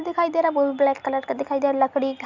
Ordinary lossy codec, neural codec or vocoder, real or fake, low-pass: none; none; real; 7.2 kHz